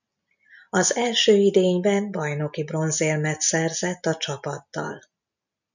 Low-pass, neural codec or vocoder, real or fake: 7.2 kHz; none; real